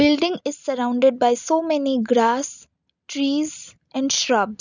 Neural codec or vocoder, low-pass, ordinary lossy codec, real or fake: none; 7.2 kHz; none; real